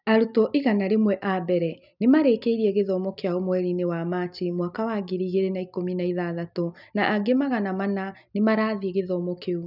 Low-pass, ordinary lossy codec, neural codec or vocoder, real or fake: 5.4 kHz; none; none; real